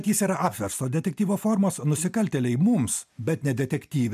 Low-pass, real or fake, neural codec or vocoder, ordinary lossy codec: 14.4 kHz; real; none; MP3, 96 kbps